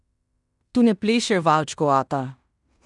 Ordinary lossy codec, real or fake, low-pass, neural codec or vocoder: none; fake; 10.8 kHz; codec, 16 kHz in and 24 kHz out, 0.9 kbps, LongCat-Audio-Codec, fine tuned four codebook decoder